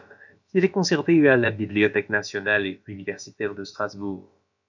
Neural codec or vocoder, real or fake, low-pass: codec, 16 kHz, about 1 kbps, DyCAST, with the encoder's durations; fake; 7.2 kHz